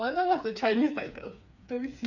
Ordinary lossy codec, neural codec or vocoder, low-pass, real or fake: none; codec, 16 kHz, 4 kbps, FreqCodec, smaller model; 7.2 kHz; fake